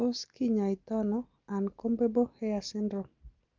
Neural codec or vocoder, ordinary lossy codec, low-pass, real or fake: none; Opus, 32 kbps; 7.2 kHz; real